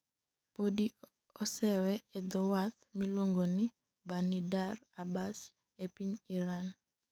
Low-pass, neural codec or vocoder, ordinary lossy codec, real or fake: none; codec, 44.1 kHz, 7.8 kbps, DAC; none; fake